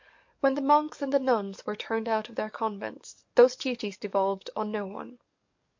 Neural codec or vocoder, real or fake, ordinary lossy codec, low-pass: codec, 44.1 kHz, 7.8 kbps, DAC; fake; MP3, 48 kbps; 7.2 kHz